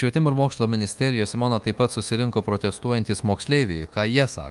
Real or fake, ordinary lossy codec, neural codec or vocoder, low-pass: fake; Opus, 32 kbps; codec, 24 kHz, 1.2 kbps, DualCodec; 10.8 kHz